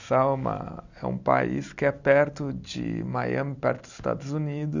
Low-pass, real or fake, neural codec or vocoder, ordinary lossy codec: 7.2 kHz; real; none; none